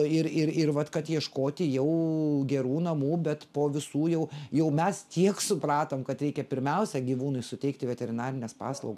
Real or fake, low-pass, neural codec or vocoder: real; 14.4 kHz; none